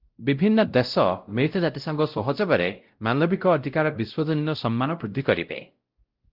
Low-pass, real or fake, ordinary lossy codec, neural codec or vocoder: 5.4 kHz; fake; Opus, 32 kbps; codec, 16 kHz, 0.5 kbps, X-Codec, WavLM features, trained on Multilingual LibriSpeech